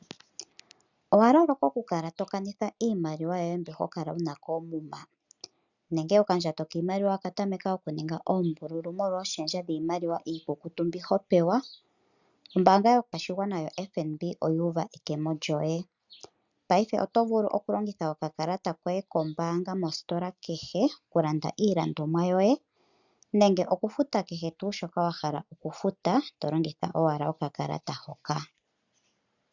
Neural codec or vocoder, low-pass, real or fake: none; 7.2 kHz; real